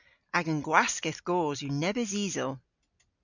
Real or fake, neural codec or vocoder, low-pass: real; none; 7.2 kHz